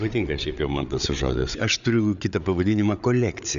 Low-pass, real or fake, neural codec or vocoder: 7.2 kHz; fake; codec, 16 kHz, 8 kbps, FreqCodec, larger model